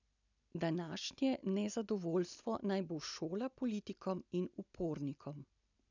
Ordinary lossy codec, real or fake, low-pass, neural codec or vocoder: none; real; 7.2 kHz; none